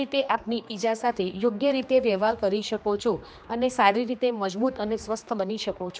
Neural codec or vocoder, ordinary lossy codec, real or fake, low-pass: codec, 16 kHz, 2 kbps, X-Codec, HuBERT features, trained on general audio; none; fake; none